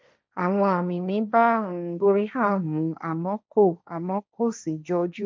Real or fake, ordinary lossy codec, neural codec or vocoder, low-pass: fake; none; codec, 16 kHz, 1.1 kbps, Voila-Tokenizer; none